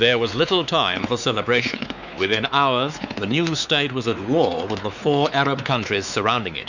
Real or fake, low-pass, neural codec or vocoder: fake; 7.2 kHz; codec, 16 kHz, 4 kbps, X-Codec, WavLM features, trained on Multilingual LibriSpeech